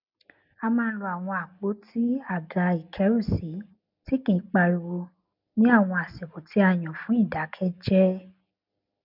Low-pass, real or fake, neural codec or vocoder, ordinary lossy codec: 5.4 kHz; real; none; none